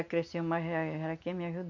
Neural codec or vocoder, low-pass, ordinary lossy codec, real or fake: none; 7.2 kHz; none; real